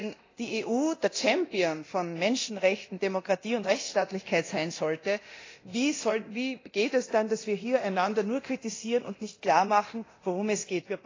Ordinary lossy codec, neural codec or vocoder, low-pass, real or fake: AAC, 32 kbps; codec, 24 kHz, 0.9 kbps, DualCodec; 7.2 kHz; fake